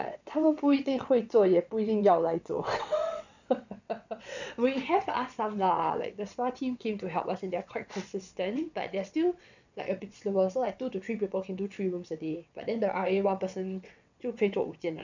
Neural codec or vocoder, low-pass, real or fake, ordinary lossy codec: vocoder, 22.05 kHz, 80 mel bands, WaveNeXt; 7.2 kHz; fake; none